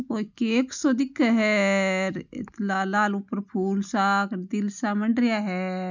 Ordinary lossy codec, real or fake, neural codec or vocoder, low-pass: none; real; none; 7.2 kHz